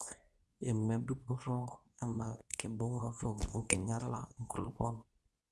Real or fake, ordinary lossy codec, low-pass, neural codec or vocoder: fake; none; none; codec, 24 kHz, 0.9 kbps, WavTokenizer, medium speech release version 2